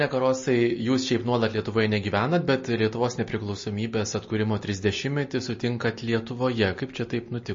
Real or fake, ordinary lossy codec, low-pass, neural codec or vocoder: real; MP3, 32 kbps; 7.2 kHz; none